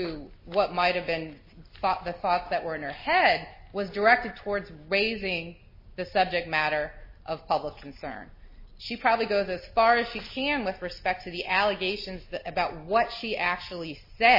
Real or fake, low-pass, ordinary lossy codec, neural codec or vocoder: real; 5.4 kHz; MP3, 24 kbps; none